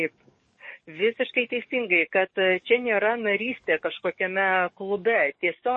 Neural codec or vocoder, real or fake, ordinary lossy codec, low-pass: codec, 44.1 kHz, 7.8 kbps, DAC; fake; MP3, 32 kbps; 10.8 kHz